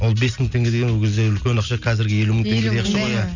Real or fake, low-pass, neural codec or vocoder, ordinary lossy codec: real; 7.2 kHz; none; none